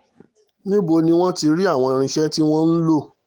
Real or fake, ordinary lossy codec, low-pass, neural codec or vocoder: fake; Opus, 24 kbps; 19.8 kHz; autoencoder, 48 kHz, 128 numbers a frame, DAC-VAE, trained on Japanese speech